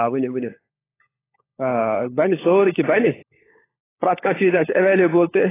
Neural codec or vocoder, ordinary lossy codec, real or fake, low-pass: codec, 16 kHz, 16 kbps, FunCodec, trained on LibriTTS, 50 frames a second; AAC, 16 kbps; fake; 3.6 kHz